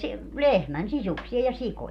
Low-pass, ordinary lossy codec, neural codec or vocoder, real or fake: 14.4 kHz; none; none; real